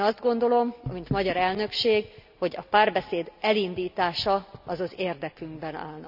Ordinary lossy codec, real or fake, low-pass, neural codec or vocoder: none; real; 5.4 kHz; none